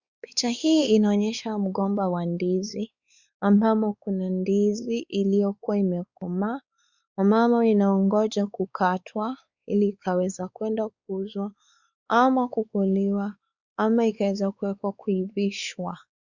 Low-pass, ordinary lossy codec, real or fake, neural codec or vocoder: 7.2 kHz; Opus, 64 kbps; fake; codec, 16 kHz, 4 kbps, X-Codec, WavLM features, trained on Multilingual LibriSpeech